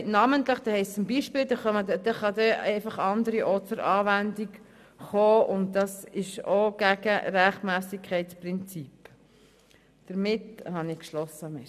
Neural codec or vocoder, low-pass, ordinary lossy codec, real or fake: none; 14.4 kHz; none; real